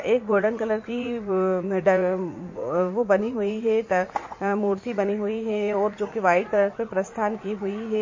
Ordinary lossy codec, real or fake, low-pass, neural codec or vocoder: MP3, 32 kbps; fake; 7.2 kHz; vocoder, 44.1 kHz, 80 mel bands, Vocos